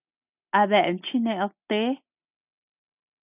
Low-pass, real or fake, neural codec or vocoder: 3.6 kHz; real; none